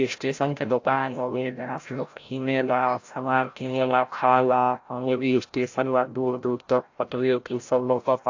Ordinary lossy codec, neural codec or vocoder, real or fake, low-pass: AAC, 48 kbps; codec, 16 kHz, 0.5 kbps, FreqCodec, larger model; fake; 7.2 kHz